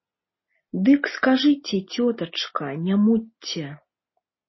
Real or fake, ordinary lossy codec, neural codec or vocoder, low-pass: real; MP3, 24 kbps; none; 7.2 kHz